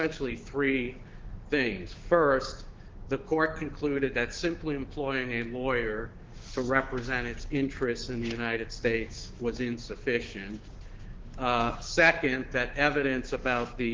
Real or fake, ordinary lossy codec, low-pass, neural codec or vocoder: fake; Opus, 16 kbps; 7.2 kHz; codec, 16 kHz, 6 kbps, DAC